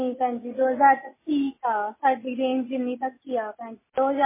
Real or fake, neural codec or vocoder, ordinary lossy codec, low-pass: real; none; MP3, 16 kbps; 3.6 kHz